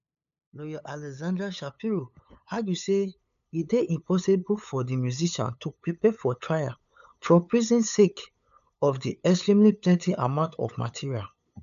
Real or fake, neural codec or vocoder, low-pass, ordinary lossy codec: fake; codec, 16 kHz, 8 kbps, FunCodec, trained on LibriTTS, 25 frames a second; 7.2 kHz; none